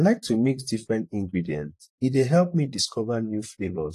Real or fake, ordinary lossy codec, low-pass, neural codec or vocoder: fake; AAC, 48 kbps; 14.4 kHz; vocoder, 44.1 kHz, 128 mel bands, Pupu-Vocoder